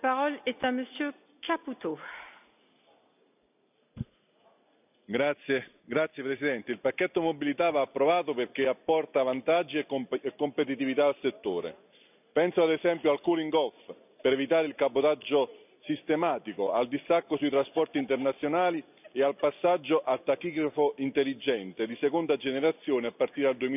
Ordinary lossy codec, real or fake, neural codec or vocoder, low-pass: none; real; none; 3.6 kHz